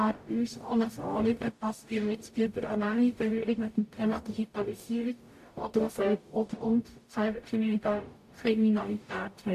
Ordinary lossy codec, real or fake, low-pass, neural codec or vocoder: AAC, 64 kbps; fake; 14.4 kHz; codec, 44.1 kHz, 0.9 kbps, DAC